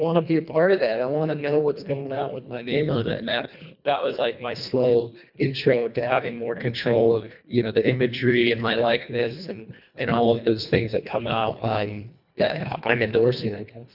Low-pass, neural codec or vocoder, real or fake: 5.4 kHz; codec, 24 kHz, 1.5 kbps, HILCodec; fake